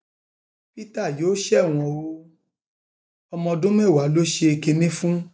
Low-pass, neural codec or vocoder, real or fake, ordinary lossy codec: none; none; real; none